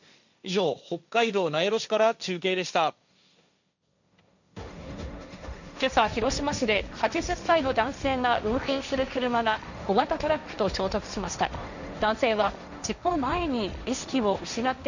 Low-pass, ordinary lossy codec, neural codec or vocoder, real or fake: 7.2 kHz; none; codec, 16 kHz, 1.1 kbps, Voila-Tokenizer; fake